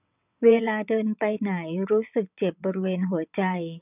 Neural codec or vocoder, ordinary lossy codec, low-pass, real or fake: vocoder, 22.05 kHz, 80 mel bands, WaveNeXt; none; 3.6 kHz; fake